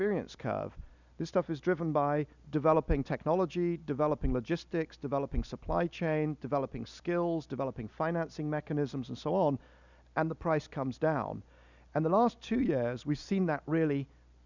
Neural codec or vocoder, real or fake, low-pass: none; real; 7.2 kHz